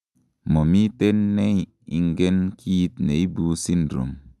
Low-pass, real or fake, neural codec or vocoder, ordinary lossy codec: none; real; none; none